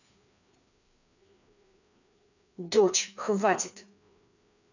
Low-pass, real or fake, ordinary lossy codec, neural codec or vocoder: 7.2 kHz; fake; none; codec, 16 kHz, 2 kbps, FreqCodec, larger model